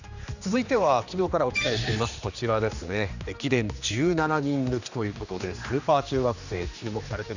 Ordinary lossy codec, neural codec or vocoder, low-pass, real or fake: none; codec, 16 kHz, 2 kbps, X-Codec, HuBERT features, trained on general audio; 7.2 kHz; fake